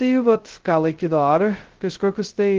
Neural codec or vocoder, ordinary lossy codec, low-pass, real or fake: codec, 16 kHz, 0.2 kbps, FocalCodec; Opus, 16 kbps; 7.2 kHz; fake